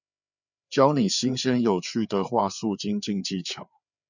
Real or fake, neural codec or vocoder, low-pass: fake; codec, 16 kHz, 4 kbps, FreqCodec, larger model; 7.2 kHz